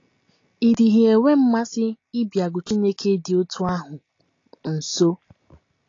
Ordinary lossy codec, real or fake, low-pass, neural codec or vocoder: AAC, 32 kbps; real; 7.2 kHz; none